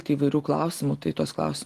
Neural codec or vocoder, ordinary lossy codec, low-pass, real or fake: none; Opus, 24 kbps; 14.4 kHz; real